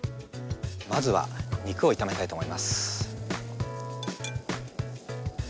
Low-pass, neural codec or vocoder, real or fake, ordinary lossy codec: none; none; real; none